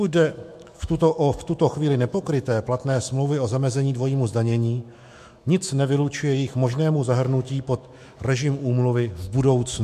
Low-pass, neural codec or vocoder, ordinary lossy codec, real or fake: 14.4 kHz; autoencoder, 48 kHz, 128 numbers a frame, DAC-VAE, trained on Japanese speech; MP3, 64 kbps; fake